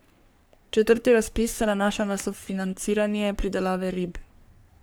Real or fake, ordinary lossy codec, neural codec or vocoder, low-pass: fake; none; codec, 44.1 kHz, 3.4 kbps, Pupu-Codec; none